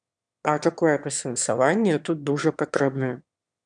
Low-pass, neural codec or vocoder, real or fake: 9.9 kHz; autoencoder, 22.05 kHz, a latent of 192 numbers a frame, VITS, trained on one speaker; fake